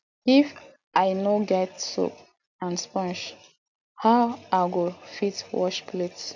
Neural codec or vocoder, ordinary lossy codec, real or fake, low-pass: none; none; real; 7.2 kHz